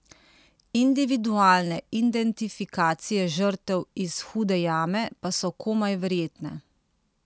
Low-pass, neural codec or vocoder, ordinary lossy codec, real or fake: none; none; none; real